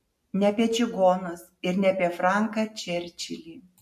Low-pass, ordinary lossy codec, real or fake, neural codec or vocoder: 14.4 kHz; MP3, 64 kbps; real; none